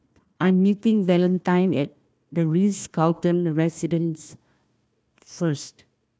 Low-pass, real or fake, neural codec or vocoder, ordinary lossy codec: none; fake; codec, 16 kHz, 1 kbps, FunCodec, trained on Chinese and English, 50 frames a second; none